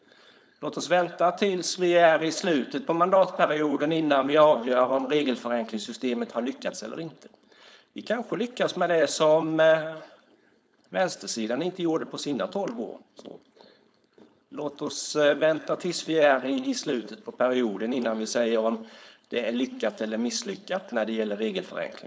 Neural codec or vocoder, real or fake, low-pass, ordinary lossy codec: codec, 16 kHz, 4.8 kbps, FACodec; fake; none; none